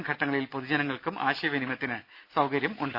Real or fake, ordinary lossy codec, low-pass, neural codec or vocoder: real; AAC, 48 kbps; 5.4 kHz; none